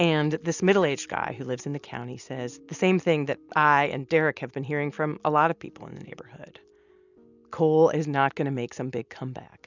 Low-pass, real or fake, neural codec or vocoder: 7.2 kHz; real; none